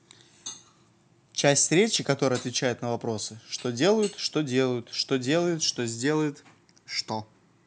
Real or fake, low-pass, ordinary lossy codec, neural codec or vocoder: real; none; none; none